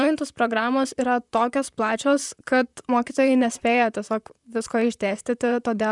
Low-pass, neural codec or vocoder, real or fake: 10.8 kHz; vocoder, 44.1 kHz, 128 mel bands, Pupu-Vocoder; fake